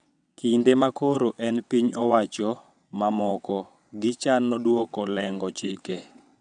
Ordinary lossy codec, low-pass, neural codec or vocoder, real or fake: none; 9.9 kHz; vocoder, 22.05 kHz, 80 mel bands, WaveNeXt; fake